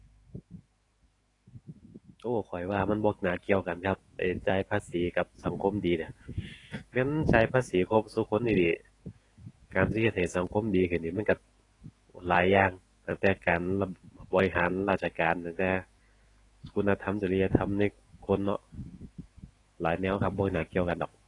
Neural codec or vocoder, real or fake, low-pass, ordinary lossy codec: autoencoder, 48 kHz, 128 numbers a frame, DAC-VAE, trained on Japanese speech; fake; 10.8 kHz; AAC, 32 kbps